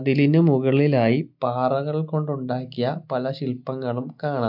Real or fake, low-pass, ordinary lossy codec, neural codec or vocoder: real; 5.4 kHz; MP3, 48 kbps; none